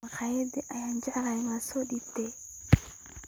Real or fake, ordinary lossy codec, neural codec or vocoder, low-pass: real; none; none; none